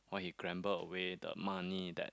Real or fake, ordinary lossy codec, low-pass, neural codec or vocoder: real; none; none; none